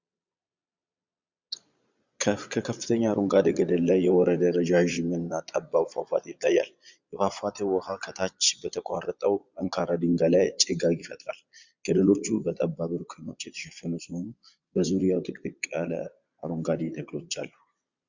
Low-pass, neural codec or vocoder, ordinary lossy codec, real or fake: 7.2 kHz; vocoder, 22.05 kHz, 80 mel bands, Vocos; Opus, 64 kbps; fake